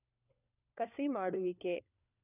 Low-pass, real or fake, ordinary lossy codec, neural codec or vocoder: 3.6 kHz; fake; none; codec, 16 kHz, 4 kbps, FunCodec, trained on LibriTTS, 50 frames a second